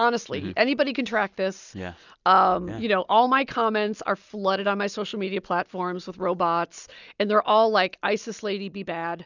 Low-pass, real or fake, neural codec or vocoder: 7.2 kHz; real; none